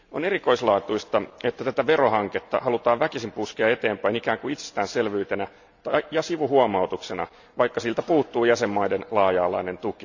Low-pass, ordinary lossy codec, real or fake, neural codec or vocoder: 7.2 kHz; none; real; none